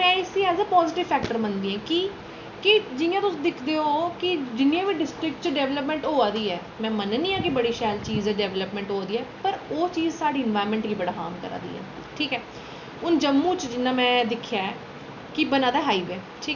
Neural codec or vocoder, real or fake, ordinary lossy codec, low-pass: none; real; none; 7.2 kHz